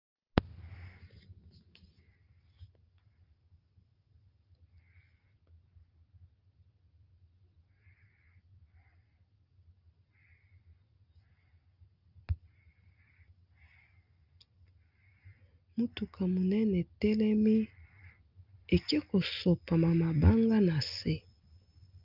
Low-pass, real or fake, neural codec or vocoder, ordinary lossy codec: 5.4 kHz; real; none; Opus, 32 kbps